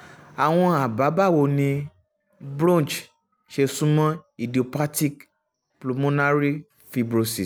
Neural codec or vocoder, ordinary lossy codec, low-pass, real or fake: none; none; none; real